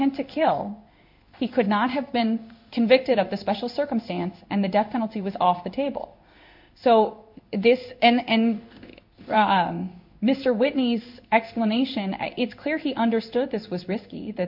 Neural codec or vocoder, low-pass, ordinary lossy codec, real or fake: codec, 16 kHz in and 24 kHz out, 1 kbps, XY-Tokenizer; 5.4 kHz; MP3, 48 kbps; fake